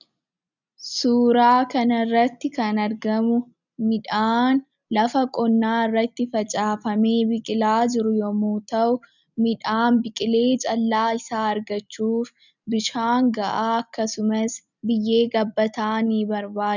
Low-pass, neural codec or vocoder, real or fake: 7.2 kHz; none; real